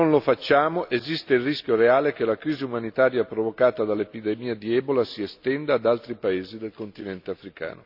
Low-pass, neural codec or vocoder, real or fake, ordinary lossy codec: 5.4 kHz; none; real; none